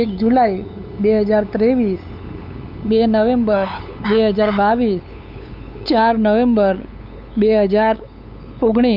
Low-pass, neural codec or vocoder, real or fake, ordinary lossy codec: 5.4 kHz; codec, 16 kHz, 8 kbps, FunCodec, trained on LibriTTS, 25 frames a second; fake; none